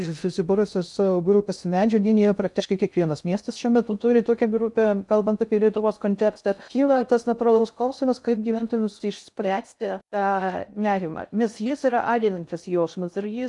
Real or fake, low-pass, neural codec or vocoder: fake; 10.8 kHz; codec, 16 kHz in and 24 kHz out, 0.6 kbps, FocalCodec, streaming, 2048 codes